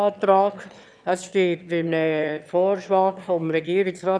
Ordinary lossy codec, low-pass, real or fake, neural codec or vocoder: none; none; fake; autoencoder, 22.05 kHz, a latent of 192 numbers a frame, VITS, trained on one speaker